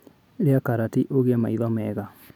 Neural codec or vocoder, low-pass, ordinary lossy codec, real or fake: none; 19.8 kHz; none; real